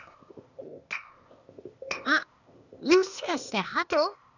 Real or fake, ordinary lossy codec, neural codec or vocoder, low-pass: fake; none; codec, 16 kHz, 0.8 kbps, ZipCodec; 7.2 kHz